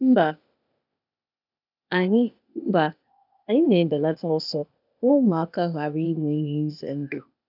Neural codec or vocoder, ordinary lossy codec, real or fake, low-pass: codec, 16 kHz, 0.8 kbps, ZipCodec; none; fake; 5.4 kHz